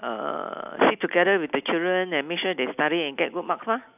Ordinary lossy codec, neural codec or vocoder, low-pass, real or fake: none; none; 3.6 kHz; real